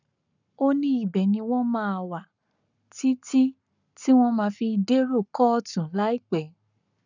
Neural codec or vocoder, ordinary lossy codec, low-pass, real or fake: codec, 44.1 kHz, 7.8 kbps, Pupu-Codec; none; 7.2 kHz; fake